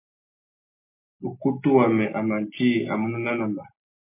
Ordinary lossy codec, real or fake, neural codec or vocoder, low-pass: MP3, 24 kbps; real; none; 3.6 kHz